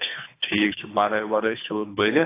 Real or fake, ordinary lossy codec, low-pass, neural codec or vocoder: fake; AAC, 24 kbps; 3.6 kHz; codec, 24 kHz, 3 kbps, HILCodec